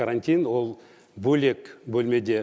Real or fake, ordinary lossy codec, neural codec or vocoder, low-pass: real; none; none; none